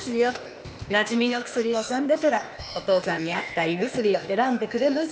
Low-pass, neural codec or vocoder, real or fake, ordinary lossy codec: none; codec, 16 kHz, 0.8 kbps, ZipCodec; fake; none